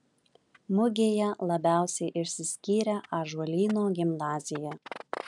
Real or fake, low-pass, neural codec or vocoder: real; 10.8 kHz; none